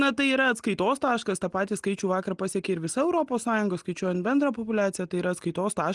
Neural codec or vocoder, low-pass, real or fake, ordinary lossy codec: none; 10.8 kHz; real; Opus, 24 kbps